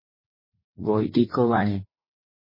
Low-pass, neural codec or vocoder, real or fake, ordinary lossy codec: 5.4 kHz; vocoder, 44.1 kHz, 80 mel bands, Vocos; fake; MP3, 24 kbps